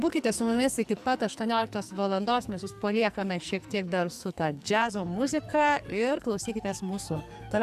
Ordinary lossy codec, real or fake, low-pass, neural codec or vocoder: AAC, 96 kbps; fake; 14.4 kHz; codec, 44.1 kHz, 2.6 kbps, SNAC